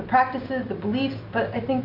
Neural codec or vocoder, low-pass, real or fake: none; 5.4 kHz; real